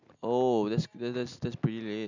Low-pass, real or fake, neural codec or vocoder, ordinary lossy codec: 7.2 kHz; real; none; none